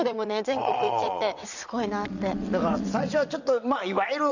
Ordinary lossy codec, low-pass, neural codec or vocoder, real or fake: none; 7.2 kHz; vocoder, 22.05 kHz, 80 mel bands, WaveNeXt; fake